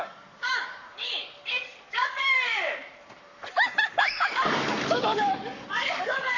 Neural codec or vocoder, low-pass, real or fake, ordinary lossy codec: codec, 44.1 kHz, 7.8 kbps, Pupu-Codec; 7.2 kHz; fake; none